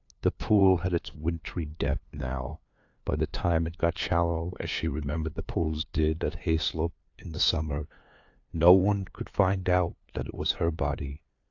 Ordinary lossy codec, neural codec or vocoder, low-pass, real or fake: AAC, 48 kbps; codec, 16 kHz, 2 kbps, FunCodec, trained on LibriTTS, 25 frames a second; 7.2 kHz; fake